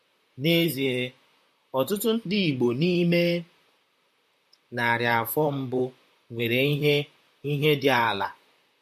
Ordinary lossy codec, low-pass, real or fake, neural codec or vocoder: MP3, 64 kbps; 14.4 kHz; fake; vocoder, 44.1 kHz, 128 mel bands, Pupu-Vocoder